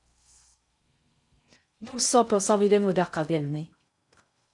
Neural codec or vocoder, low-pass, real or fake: codec, 16 kHz in and 24 kHz out, 0.6 kbps, FocalCodec, streaming, 4096 codes; 10.8 kHz; fake